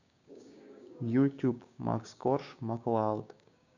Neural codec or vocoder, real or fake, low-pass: codec, 16 kHz, 6 kbps, DAC; fake; 7.2 kHz